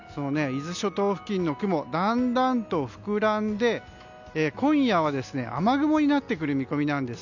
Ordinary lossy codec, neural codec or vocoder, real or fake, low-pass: none; none; real; 7.2 kHz